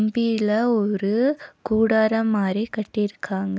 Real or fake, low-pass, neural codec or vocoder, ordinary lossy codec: real; none; none; none